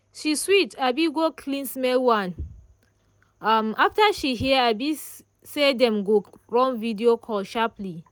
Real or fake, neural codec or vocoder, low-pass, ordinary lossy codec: real; none; none; none